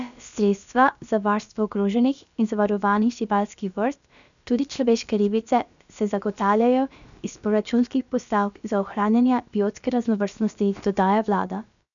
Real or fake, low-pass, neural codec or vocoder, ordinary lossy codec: fake; 7.2 kHz; codec, 16 kHz, about 1 kbps, DyCAST, with the encoder's durations; none